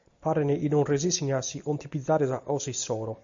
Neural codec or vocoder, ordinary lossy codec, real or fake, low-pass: none; MP3, 64 kbps; real; 7.2 kHz